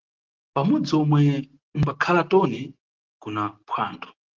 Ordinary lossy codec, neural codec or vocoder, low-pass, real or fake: Opus, 16 kbps; none; 7.2 kHz; real